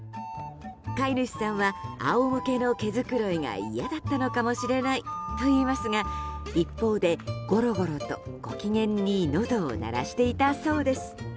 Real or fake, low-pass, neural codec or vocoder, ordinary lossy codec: real; none; none; none